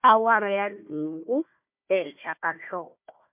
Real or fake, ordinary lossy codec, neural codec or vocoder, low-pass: fake; MP3, 32 kbps; codec, 16 kHz, 1 kbps, FunCodec, trained on Chinese and English, 50 frames a second; 3.6 kHz